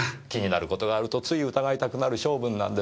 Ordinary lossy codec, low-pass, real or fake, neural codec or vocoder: none; none; real; none